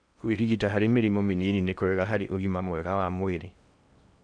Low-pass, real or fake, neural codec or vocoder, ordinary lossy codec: 9.9 kHz; fake; codec, 16 kHz in and 24 kHz out, 0.8 kbps, FocalCodec, streaming, 65536 codes; none